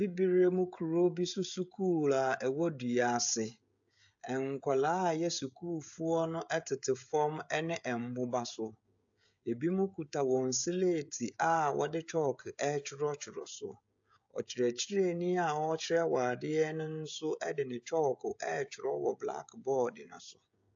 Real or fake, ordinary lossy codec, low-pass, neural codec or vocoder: fake; AAC, 64 kbps; 7.2 kHz; codec, 16 kHz, 16 kbps, FreqCodec, smaller model